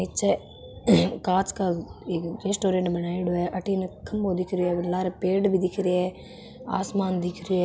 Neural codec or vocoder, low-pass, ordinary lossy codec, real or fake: none; none; none; real